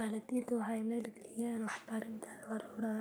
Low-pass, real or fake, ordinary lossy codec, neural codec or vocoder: none; fake; none; codec, 44.1 kHz, 3.4 kbps, Pupu-Codec